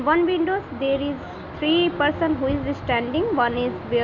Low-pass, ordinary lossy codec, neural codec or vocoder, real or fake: 7.2 kHz; none; none; real